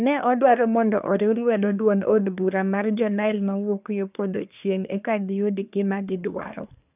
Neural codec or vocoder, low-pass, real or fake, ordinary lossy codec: codec, 24 kHz, 1 kbps, SNAC; 3.6 kHz; fake; none